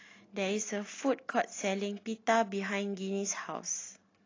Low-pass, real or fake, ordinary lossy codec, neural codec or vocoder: 7.2 kHz; real; AAC, 32 kbps; none